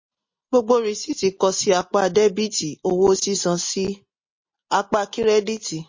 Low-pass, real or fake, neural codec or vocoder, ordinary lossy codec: 7.2 kHz; real; none; MP3, 32 kbps